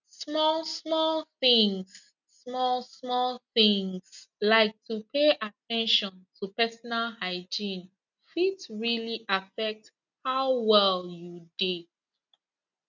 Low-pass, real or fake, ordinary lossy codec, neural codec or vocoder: 7.2 kHz; real; none; none